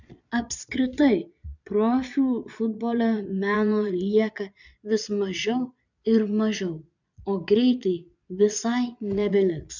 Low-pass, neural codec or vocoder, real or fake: 7.2 kHz; vocoder, 22.05 kHz, 80 mel bands, Vocos; fake